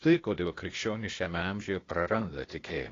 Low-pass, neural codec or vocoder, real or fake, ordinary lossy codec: 7.2 kHz; codec, 16 kHz, 0.8 kbps, ZipCodec; fake; AAC, 32 kbps